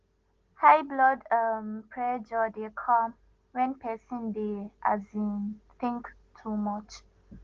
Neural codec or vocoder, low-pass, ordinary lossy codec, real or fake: none; 7.2 kHz; Opus, 16 kbps; real